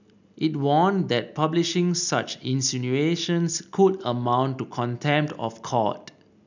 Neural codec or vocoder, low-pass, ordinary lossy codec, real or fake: none; 7.2 kHz; none; real